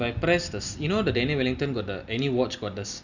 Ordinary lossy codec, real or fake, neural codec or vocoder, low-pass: none; real; none; 7.2 kHz